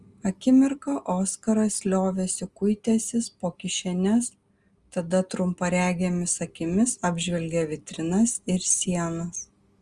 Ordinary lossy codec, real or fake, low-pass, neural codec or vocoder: Opus, 32 kbps; real; 10.8 kHz; none